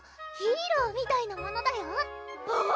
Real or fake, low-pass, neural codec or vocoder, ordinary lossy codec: real; none; none; none